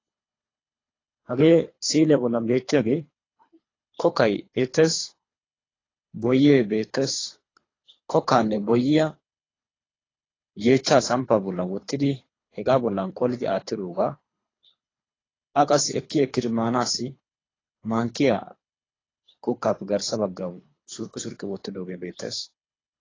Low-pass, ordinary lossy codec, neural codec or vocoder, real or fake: 7.2 kHz; AAC, 32 kbps; codec, 24 kHz, 3 kbps, HILCodec; fake